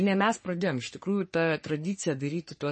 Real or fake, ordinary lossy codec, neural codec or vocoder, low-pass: fake; MP3, 32 kbps; codec, 44.1 kHz, 3.4 kbps, Pupu-Codec; 10.8 kHz